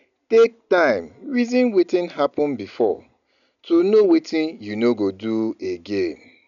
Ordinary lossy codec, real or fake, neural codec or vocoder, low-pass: none; real; none; 7.2 kHz